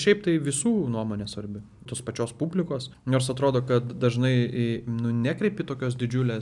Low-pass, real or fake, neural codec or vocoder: 10.8 kHz; real; none